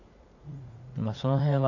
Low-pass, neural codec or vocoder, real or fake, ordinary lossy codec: 7.2 kHz; vocoder, 44.1 kHz, 80 mel bands, Vocos; fake; none